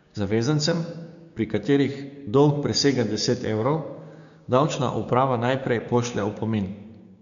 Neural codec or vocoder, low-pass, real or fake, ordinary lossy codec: codec, 16 kHz, 6 kbps, DAC; 7.2 kHz; fake; none